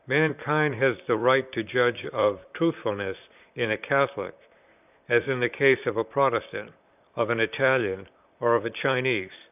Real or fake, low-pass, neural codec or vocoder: fake; 3.6 kHz; vocoder, 44.1 kHz, 128 mel bands, Pupu-Vocoder